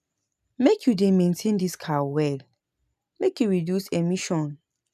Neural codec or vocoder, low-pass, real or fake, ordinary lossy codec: none; 14.4 kHz; real; none